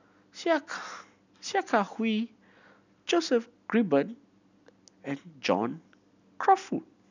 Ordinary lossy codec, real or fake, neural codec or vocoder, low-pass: none; real; none; 7.2 kHz